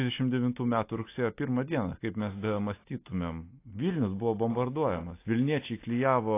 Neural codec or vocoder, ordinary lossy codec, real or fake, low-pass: none; AAC, 24 kbps; real; 3.6 kHz